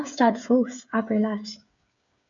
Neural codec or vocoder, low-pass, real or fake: codec, 16 kHz, 8 kbps, FreqCodec, smaller model; 7.2 kHz; fake